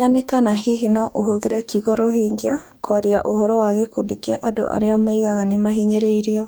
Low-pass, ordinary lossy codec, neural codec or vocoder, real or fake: none; none; codec, 44.1 kHz, 2.6 kbps, DAC; fake